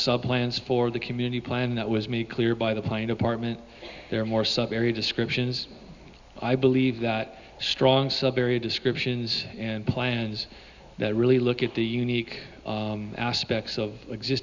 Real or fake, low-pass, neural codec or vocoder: real; 7.2 kHz; none